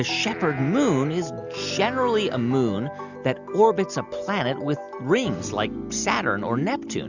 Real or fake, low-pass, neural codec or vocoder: real; 7.2 kHz; none